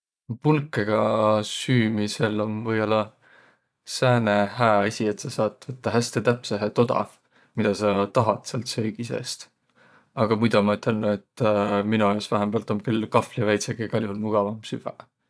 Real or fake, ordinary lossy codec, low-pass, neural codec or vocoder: fake; none; none; vocoder, 22.05 kHz, 80 mel bands, Vocos